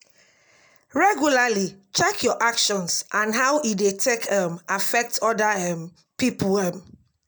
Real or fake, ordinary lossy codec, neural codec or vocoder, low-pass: real; none; none; none